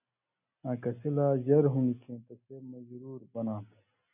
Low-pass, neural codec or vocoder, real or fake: 3.6 kHz; none; real